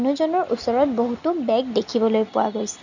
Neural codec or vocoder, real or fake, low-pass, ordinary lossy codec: none; real; 7.2 kHz; none